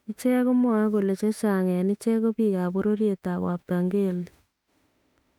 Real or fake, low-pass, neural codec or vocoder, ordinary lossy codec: fake; 19.8 kHz; autoencoder, 48 kHz, 32 numbers a frame, DAC-VAE, trained on Japanese speech; none